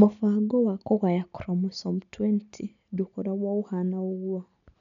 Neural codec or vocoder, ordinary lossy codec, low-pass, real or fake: none; none; 7.2 kHz; real